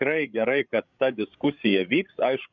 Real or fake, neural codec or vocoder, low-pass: fake; codec, 16 kHz, 16 kbps, FreqCodec, larger model; 7.2 kHz